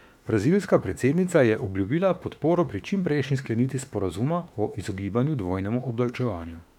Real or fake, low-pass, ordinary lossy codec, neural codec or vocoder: fake; 19.8 kHz; none; autoencoder, 48 kHz, 32 numbers a frame, DAC-VAE, trained on Japanese speech